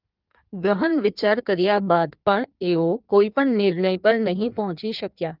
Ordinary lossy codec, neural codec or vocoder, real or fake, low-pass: Opus, 32 kbps; codec, 16 kHz in and 24 kHz out, 1.1 kbps, FireRedTTS-2 codec; fake; 5.4 kHz